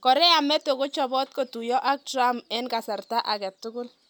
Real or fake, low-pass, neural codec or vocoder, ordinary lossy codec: real; none; none; none